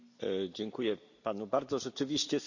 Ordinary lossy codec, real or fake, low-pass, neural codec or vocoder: none; real; 7.2 kHz; none